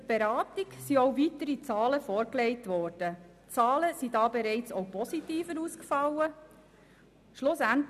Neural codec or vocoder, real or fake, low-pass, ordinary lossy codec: none; real; 14.4 kHz; none